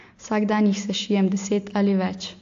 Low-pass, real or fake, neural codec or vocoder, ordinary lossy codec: 7.2 kHz; real; none; AAC, 48 kbps